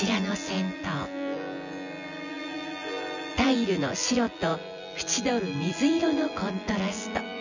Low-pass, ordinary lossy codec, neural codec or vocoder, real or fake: 7.2 kHz; none; vocoder, 24 kHz, 100 mel bands, Vocos; fake